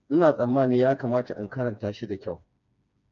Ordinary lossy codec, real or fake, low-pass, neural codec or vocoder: MP3, 96 kbps; fake; 7.2 kHz; codec, 16 kHz, 2 kbps, FreqCodec, smaller model